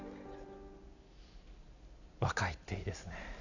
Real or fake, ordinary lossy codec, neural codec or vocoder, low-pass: real; none; none; 7.2 kHz